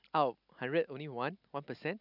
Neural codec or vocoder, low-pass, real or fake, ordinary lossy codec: none; 5.4 kHz; real; none